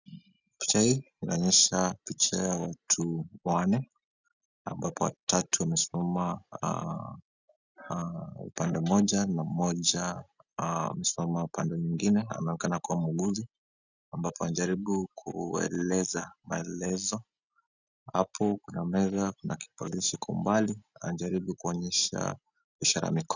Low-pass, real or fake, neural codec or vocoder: 7.2 kHz; real; none